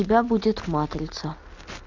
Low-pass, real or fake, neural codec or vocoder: 7.2 kHz; real; none